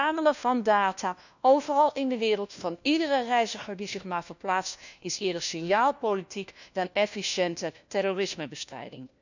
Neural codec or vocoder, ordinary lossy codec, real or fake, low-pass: codec, 16 kHz, 1 kbps, FunCodec, trained on LibriTTS, 50 frames a second; none; fake; 7.2 kHz